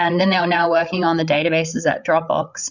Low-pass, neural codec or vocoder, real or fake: 7.2 kHz; codec, 16 kHz, 8 kbps, FreqCodec, larger model; fake